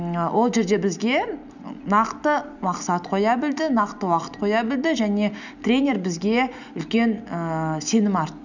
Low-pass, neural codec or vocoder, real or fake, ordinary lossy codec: 7.2 kHz; none; real; none